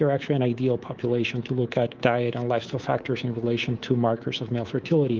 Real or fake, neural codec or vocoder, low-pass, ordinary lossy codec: fake; codec, 24 kHz, 3.1 kbps, DualCodec; 7.2 kHz; Opus, 32 kbps